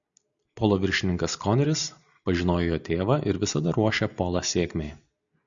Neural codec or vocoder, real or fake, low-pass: none; real; 7.2 kHz